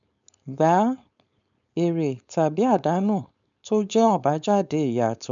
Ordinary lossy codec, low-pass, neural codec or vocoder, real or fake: MP3, 96 kbps; 7.2 kHz; codec, 16 kHz, 4.8 kbps, FACodec; fake